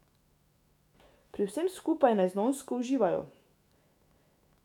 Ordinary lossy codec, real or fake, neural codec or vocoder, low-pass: none; fake; autoencoder, 48 kHz, 128 numbers a frame, DAC-VAE, trained on Japanese speech; 19.8 kHz